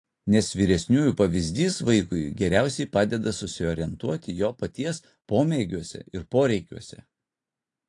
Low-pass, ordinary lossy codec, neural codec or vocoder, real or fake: 10.8 kHz; AAC, 48 kbps; none; real